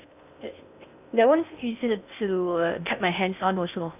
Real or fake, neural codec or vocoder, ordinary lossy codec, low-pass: fake; codec, 16 kHz in and 24 kHz out, 0.6 kbps, FocalCodec, streaming, 2048 codes; none; 3.6 kHz